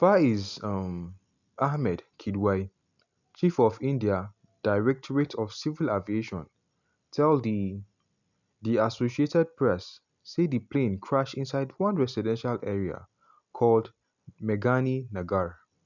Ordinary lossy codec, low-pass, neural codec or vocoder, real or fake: none; 7.2 kHz; none; real